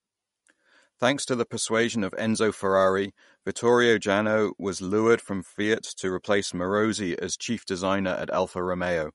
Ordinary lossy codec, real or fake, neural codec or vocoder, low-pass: MP3, 48 kbps; real; none; 19.8 kHz